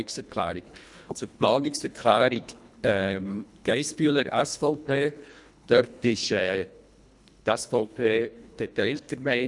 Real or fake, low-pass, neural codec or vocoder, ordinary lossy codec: fake; 10.8 kHz; codec, 24 kHz, 1.5 kbps, HILCodec; none